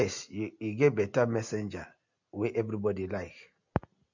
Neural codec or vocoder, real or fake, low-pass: none; real; 7.2 kHz